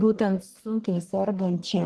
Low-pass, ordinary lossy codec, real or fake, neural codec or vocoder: 10.8 kHz; Opus, 16 kbps; fake; codec, 44.1 kHz, 1.7 kbps, Pupu-Codec